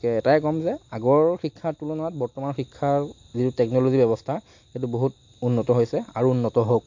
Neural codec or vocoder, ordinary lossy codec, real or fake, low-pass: none; MP3, 48 kbps; real; 7.2 kHz